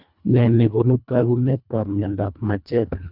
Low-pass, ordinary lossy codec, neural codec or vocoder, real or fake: 5.4 kHz; none; codec, 24 kHz, 1.5 kbps, HILCodec; fake